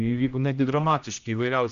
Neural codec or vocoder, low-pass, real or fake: codec, 16 kHz, 1 kbps, X-Codec, HuBERT features, trained on general audio; 7.2 kHz; fake